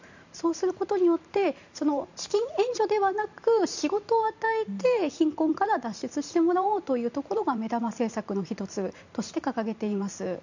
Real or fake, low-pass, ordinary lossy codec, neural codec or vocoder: fake; 7.2 kHz; none; vocoder, 44.1 kHz, 128 mel bands every 512 samples, BigVGAN v2